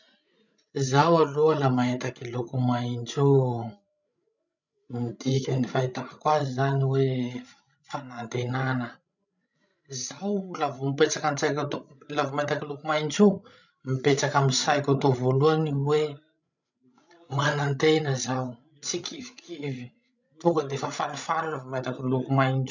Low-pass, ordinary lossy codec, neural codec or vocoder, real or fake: 7.2 kHz; none; codec, 16 kHz, 16 kbps, FreqCodec, larger model; fake